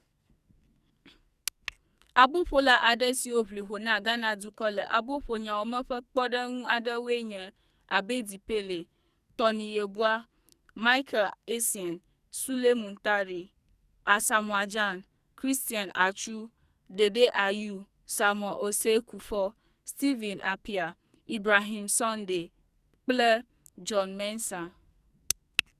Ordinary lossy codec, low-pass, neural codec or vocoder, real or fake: Opus, 64 kbps; 14.4 kHz; codec, 44.1 kHz, 2.6 kbps, SNAC; fake